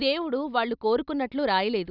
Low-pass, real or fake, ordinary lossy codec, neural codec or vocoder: 5.4 kHz; real; none; none